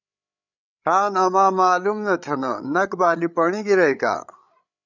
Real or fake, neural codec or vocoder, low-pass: fake; codec, 16 kHz, 8 kbps, FreqCodec, larger model; 7.2 kHz